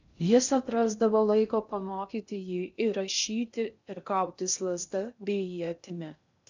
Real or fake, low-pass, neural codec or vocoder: fake; 7.2 kHz; codec, 16 kHz in and 24 kHz out, 0.6 kbps, FocalCodec, streaming, 2048 codes